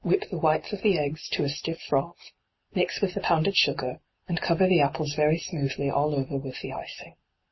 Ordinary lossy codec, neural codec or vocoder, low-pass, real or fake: MP3, 24 kbps; none; 7.2 kHz; real